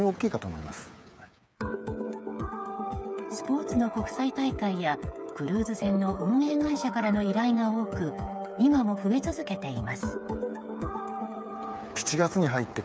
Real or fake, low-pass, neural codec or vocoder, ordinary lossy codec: fake; none; codec, 16 kHz, 8 kbps, FreqCodec, smaller model; none